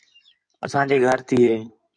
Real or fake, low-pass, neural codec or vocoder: fake; 9.9 kHz; codec, 16 kHz in and 24 kHz out, 2.2 kbps, FireRedTTS-2 codec